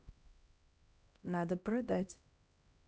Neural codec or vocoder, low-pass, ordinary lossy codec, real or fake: codec, 16 kHz, 1 kbps, X-Codec, HuBERT features, trained on LibriSpeech; none; none; fake